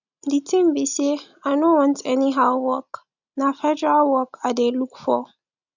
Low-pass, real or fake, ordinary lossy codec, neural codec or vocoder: 7.2 kHz; real; none; none